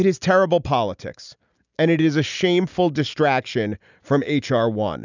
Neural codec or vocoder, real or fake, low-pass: none; real; 7.2 kHz